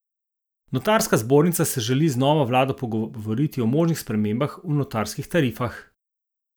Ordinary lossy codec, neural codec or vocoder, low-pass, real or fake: none; none; none; real